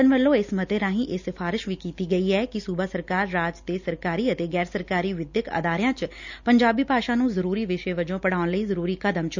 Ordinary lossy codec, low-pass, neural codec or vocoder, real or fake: none; 7.2 kHz; none; real